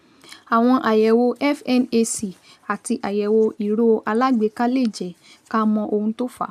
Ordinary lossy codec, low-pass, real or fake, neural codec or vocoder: none; 14.4 kHz; real; none